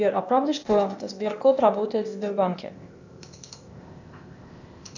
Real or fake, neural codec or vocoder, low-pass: fake; codec, 16 kHz, 0.8 kbps, ZipCodec; 7.2 kHz